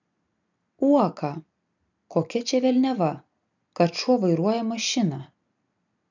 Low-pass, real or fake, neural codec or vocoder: 7.2 kHz; real; none